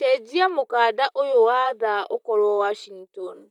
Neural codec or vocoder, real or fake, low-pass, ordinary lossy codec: vocoder, 44.1 kHz, 128 mel bands, Pupu-Vocoder; fake; 19.8 kHz; none